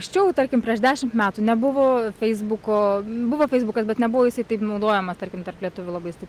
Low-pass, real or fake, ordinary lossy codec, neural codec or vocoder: 14.4 kHz; real; Opus, 24 kbps; none